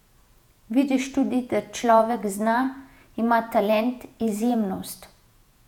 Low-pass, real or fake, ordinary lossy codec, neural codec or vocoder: 19.8 kHz; fake; none; vocoder, 48 kHz, 128 mel bands, Vocos